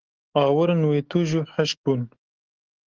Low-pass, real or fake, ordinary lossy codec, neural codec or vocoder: 7.2 kHz; fake; Opus, 16 kbps; autoencoder, 48 kHz, 128 numbers a frame, DAC-VAE, trained on Japanese speech